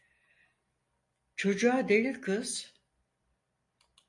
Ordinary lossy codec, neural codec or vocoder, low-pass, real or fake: MP3, 48 kbps; none; 10.8 kHz; real